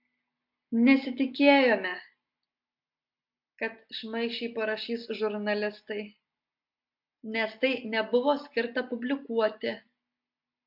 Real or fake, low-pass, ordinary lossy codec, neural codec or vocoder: real; 5.4 kHz; MP3, 48 kbps; none